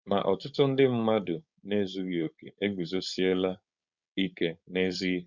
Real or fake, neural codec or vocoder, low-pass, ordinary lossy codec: fake; codec, 16 kHz, 4.8 kbps, FACodec; 7.2 kHz; Opus, 64 kbps